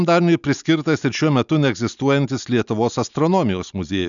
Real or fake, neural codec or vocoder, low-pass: real; none; 7.2 kHz